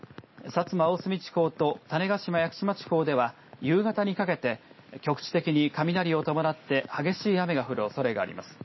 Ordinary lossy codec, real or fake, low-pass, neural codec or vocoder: MP3, 24 kbps; real; 7.2 kHz; none